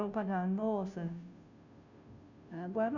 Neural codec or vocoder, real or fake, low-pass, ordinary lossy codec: codec, 16 kHz, 0.5 kbps, FunCodec, trained on Chinese and English, 25 frames a second; fake; 7.2 kHz; none